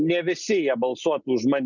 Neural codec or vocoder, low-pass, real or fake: none; 7.2 kHz; real